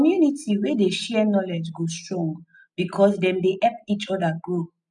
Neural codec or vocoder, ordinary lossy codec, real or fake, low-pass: none; none; real; 10.8 kHz